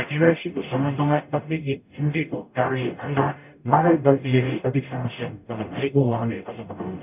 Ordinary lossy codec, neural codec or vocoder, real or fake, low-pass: none; codec, 44.1 kHz, 0.9 kbps, DAC; fake; 3.6 kHz